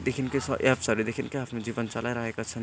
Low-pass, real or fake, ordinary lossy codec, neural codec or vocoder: none; real; none; none